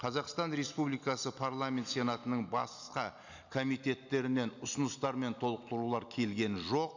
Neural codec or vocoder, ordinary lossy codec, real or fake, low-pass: none; none; real; 7.2 kHz